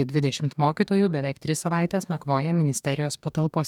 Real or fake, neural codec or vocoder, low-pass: fake; codec, 44.1 kHz, 2.6 kbps, DAC; 19.8 kHz